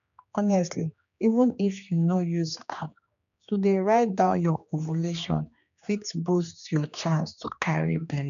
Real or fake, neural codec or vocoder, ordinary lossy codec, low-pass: fake; codec, 16 kHz, 2 kbps, X-Codec, HuBERT features, trained on general audio; none; 7.2 kHz